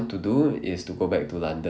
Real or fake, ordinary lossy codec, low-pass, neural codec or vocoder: real; none; none; none